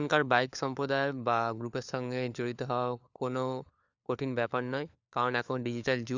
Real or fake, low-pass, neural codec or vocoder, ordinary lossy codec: fake; 7.2 kHz; codec, 16 kHz, 4 kbps, FunCodec, trained on LibriTTS, 50 frames a second; Opus, 64 kbps